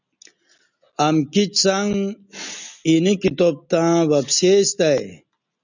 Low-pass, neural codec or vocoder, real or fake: 7.2 kHz; none; real